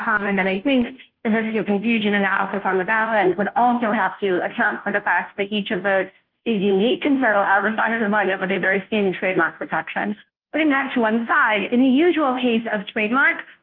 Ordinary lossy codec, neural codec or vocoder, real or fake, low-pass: Opus, 24 kbps; codec, 16 kHz, 0.5 kbps, FunCodec, trained on Chinese and English, 25 frames a second; fake; 5.4 kHz